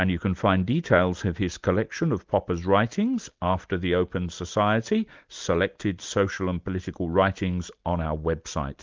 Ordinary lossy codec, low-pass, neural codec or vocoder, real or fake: Opus, 24 kbps; 7.2 kHz; none; real